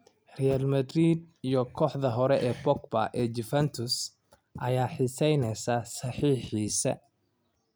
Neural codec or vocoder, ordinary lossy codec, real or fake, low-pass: none; none; real; none